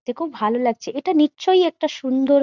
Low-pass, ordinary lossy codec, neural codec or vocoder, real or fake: 7.2 kHz; Opus, 64 kbps; none; real